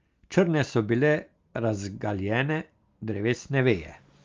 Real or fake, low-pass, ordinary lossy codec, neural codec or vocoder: real; 7.2 kHz; Opus, 32 kbps; none